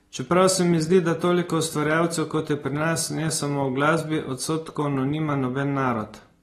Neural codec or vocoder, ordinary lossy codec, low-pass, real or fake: none; AAC, 32 kbps; 19.8 kHz; real